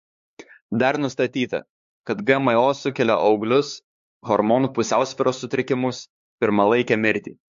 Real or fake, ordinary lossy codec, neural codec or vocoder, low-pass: fake; MP3, 48 kbps; codec, 16 kHz, 4 kbps, X-Codec, HuBERT features, trained on LibriSpeech; 7.2 kHz